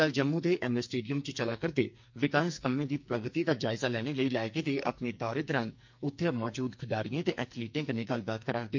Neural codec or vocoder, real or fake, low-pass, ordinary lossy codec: codec, 44.1 kHz, 2.6 kbps, SNAC; fake; 7.2 kHz; MP3, 48 kbps